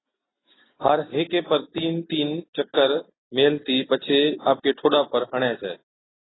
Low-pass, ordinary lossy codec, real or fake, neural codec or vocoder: 7.2 kHz; AAC, 16 kbps; real; none